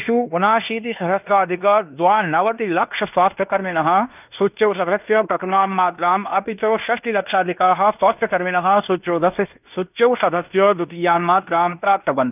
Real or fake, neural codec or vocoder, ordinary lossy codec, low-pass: fake; codec, 16 kHz in and 24 kHz out, 0.9 kbps, LongCat-Audio-Codec, fine tuned four codebook decoder; none; 3.6 kHz